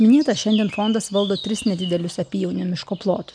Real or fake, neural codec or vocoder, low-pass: real; none; 9.9 kHz